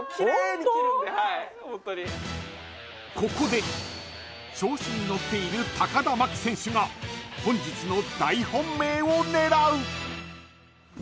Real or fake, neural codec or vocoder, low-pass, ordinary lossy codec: real; none; none; none